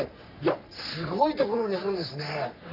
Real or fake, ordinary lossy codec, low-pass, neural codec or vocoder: fake; none; 5.4 kHz; codec, 44.1 kHz, 3.4 kbps, Pupu-Codec